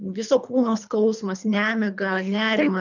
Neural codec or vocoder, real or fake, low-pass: codec, 24 kHz, 3 kbps, HILCodec; fake; 7.2 kHz